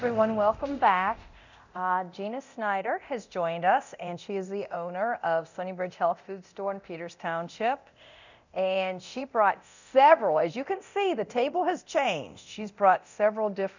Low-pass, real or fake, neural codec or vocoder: 7.2 kHz; fake; codec, 24 kHz, 0.9 kbps, DualCodec